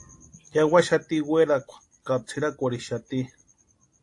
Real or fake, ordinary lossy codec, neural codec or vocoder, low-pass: real; AAC, 48 kbps; none; 10.8 kHz